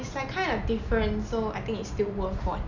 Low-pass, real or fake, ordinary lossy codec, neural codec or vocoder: 7.2 kHz; real; none; none